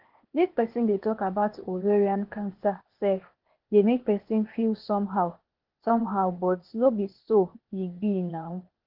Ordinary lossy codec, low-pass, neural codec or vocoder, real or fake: Opus, 16 kbps; 5.4 kHz; codec, 16 kHz, 0.8 kbps, ZipCodec; fake